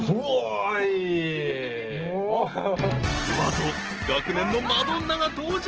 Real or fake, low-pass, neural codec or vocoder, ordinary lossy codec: real; 7.2 kHz; none; Opus, 16 kbps